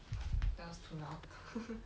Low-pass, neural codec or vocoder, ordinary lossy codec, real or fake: none; none; none; real